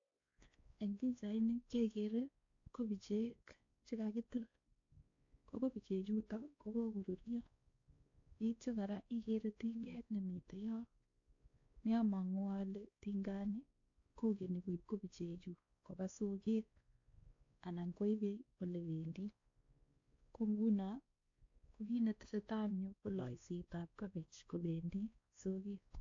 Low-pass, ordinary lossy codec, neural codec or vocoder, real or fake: 7.2 kHz; AAC, 48 kbps; codec, 16 kHz, 2 kbps, X-Codec, WavLM features, trained on Multilingual LibriSpeech; fake